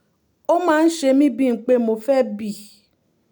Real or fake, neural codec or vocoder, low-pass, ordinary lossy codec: real; none; none; none